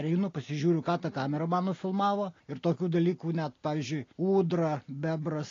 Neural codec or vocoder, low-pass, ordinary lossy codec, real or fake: none; 7.2 kHz; AAC, 32 kbps; real